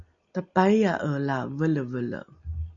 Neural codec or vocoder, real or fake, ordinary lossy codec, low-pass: none; real; MP3, 64 kbps; 7.2 kHz